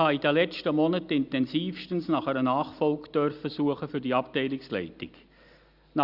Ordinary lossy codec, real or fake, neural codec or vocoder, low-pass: Opus, 64 kbps; real; none; 5.4 kHz